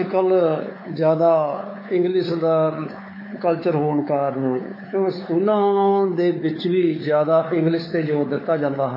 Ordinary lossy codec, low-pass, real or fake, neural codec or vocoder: MP3, 24 kbps; 5.4 kHz; fake; codec, 16 kHz, 4 kbps, X-Codec, WavLM features, trained on Multilingual LibriSpeech